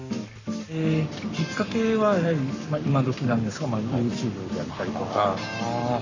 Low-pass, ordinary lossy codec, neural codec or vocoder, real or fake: 7.2 kHz; none; codec, 44.1 kHz, 7.8 kbps, Pupu-Codec; fake